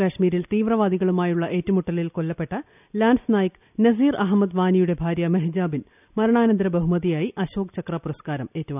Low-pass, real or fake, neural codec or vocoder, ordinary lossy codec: 3.6 kHz; real; none; none